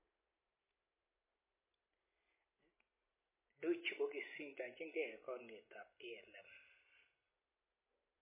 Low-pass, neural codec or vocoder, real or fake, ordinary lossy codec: 3.6 kHz; none; real; MP3, 16 kbps